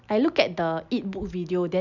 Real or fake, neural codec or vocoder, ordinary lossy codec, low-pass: real; none; none; 7.2 kHz